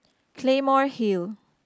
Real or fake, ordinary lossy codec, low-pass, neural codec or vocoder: real; none; none; none